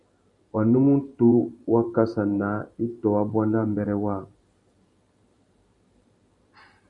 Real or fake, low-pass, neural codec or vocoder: fake; 10.8 kHz; vocoder, 44.1 kHz, 128 mel bands every 512 samples, BigVGAN v2